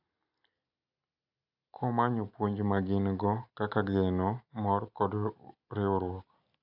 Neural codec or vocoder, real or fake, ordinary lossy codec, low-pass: none; real; AAC, 48 kbps; 5.4 kHz